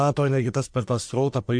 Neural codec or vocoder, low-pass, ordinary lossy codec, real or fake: codec, 44.1 kHz, 1.7 kbps, Pupu-Codec; 9.9 kHz; MP3, 64 kbps; fake